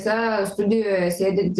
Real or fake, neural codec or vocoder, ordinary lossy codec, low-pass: real; none; Opus, 16 kbps; 10.8 kHz